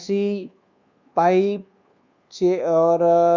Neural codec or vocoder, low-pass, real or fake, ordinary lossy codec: codec, 24 kHz, 1.2 kbps, DualCodec; 7.2 kHz; fake; Opus, 64 kbps